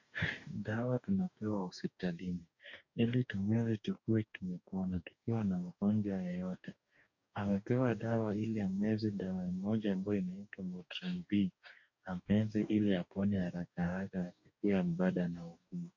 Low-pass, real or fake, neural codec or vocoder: 7.2 kHz; fake; codec, 44.1 kHz, 2.6 kbps, DAC